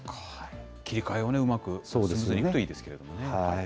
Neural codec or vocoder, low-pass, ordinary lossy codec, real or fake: none; none; none; real